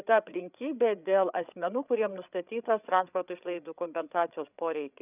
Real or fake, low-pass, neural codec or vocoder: fake; 3.6 kHz; codec, 16 kHz, 8 kbps, FreqCodec, larger model